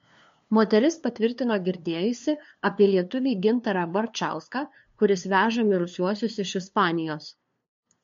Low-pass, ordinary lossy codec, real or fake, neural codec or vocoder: 7.2 kHz; MP3, 48 kbps; fake; codec, 16 kHz, 2 kbps, FunCodec, trained on LibriTTS, 25 frames a second